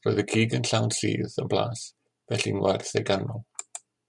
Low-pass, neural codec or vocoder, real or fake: 10.8 kHz; none; real